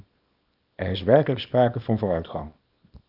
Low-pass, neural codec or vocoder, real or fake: 5.4 kHz; codec, 24 kHz, 0.9 kbps, WavTokenizer, small release; fake